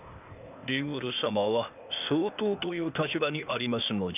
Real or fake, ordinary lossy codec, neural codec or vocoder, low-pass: fake; none; codec, 16 kHz, 0.8 kbps, ZipCodec; 3.6 kHz